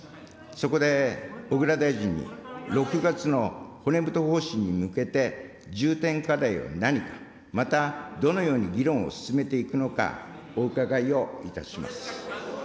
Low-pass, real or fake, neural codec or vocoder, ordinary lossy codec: none; real; none; none